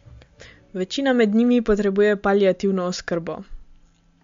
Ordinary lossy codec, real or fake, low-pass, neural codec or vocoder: MP3, 48 kbps; real; 7.2 kHz; none